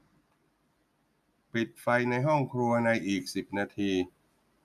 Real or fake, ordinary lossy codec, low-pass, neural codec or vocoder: real; none; 14.4 kHz; none